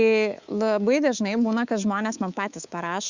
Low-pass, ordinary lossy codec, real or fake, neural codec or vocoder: 7.2 kHz; Opus, 64 kbps; fake; codec, 24 kHz, 3.1 kbps, DualCodec